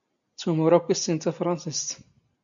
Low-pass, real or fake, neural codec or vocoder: 7.2 kHz; real; none